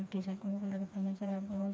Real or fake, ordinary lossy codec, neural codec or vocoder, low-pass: fake; none; codec, 16 kHz, 2 kbps, FreqCodec, smaller model; none